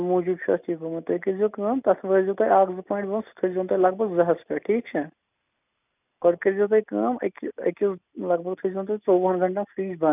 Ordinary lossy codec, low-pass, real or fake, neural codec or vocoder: none; 3.6 kHz; real; none